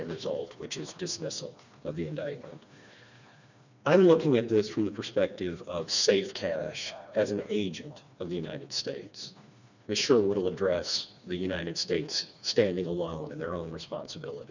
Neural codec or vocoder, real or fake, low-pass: codec, 16 kHz, 2 kbps, FreqCodec, smaller model; fake; 7.2 kHz